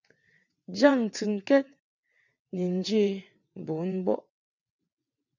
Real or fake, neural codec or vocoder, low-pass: fake; vocoder, 22.05 kHz, 80 mel bands, WaveNeXt; 7.2 kHz